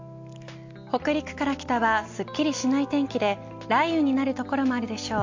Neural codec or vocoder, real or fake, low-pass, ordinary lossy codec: none; real; 7.2 kHz; MP3, 48 kbps